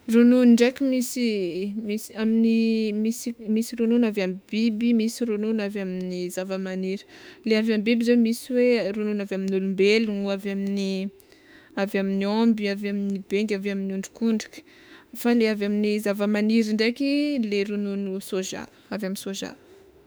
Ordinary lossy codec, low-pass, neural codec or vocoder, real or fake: none; none; autoencoder, 48 kHz, 32 numbers a frame, DAC-VAE, trained on Japanese speech; fake